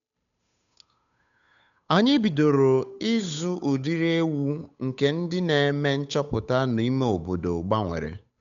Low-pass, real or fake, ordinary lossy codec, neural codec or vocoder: 7.2 kHz; fake; none; codec, 16 kHz, 8 kbps, FunCodec, trained on Chinese and English, 25 frames a second